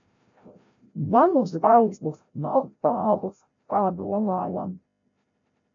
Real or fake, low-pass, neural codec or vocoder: fake; 7.2 kHz; codec, 16 kHz, 0.5 kbps, FreqCodec, larger model